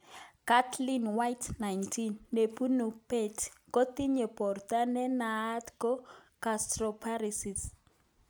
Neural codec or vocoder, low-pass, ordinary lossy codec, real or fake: none; none; none; real